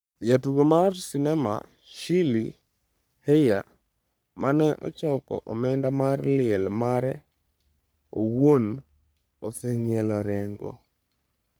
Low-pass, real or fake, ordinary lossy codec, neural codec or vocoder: none; fake; none; codec, 44.1 kHz, 3.4 kbps, Pupu-Codec